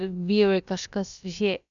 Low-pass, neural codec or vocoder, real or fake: 7.2 kHz; codec, 16 kHz, about 1 kbps, DyCAST, with the encoder's durations; fake